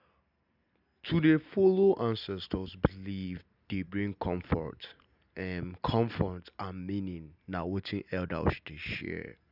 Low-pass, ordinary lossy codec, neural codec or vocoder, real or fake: 5.4 kHz; none; none; real